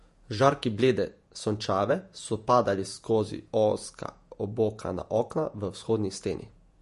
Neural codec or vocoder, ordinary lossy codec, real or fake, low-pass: vocoder, 48 kHz, 128 mel bands, Vocos; MP3, 48 kbps; fake; 14.4 kHz